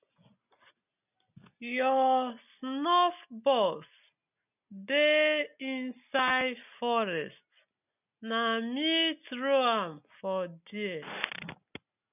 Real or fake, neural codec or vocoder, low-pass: real; none; 3.6 kHz